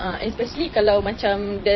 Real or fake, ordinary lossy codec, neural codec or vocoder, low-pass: fake; MP3, 24 kbps; vocoder, 22.05 kHz, 80 mel bands, Vocos; 7.2 kHz